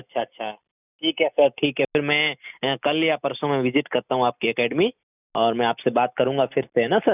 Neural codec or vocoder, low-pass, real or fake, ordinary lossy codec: none; 3.6 kHz; real; none